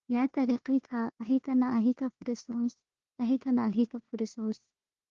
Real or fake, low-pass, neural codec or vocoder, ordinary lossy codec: fake; 7.2 kHz; codec, 16 kHz, 1 kbps, FunCodec, trained on Chinese and English, 50 frames a second; Opus, 16 kbps